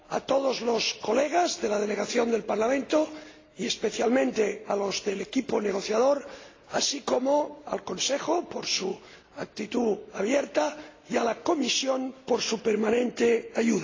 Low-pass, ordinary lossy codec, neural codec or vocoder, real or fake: 7.2 kHz; AAC, 32 kbps; none; real